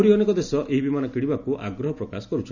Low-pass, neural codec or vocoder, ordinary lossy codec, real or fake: 7.2 kHz; none; none; real